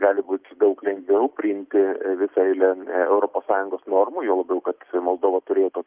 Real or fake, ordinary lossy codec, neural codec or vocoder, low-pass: real; Opus, 24 kbps; none; 3.6 kHz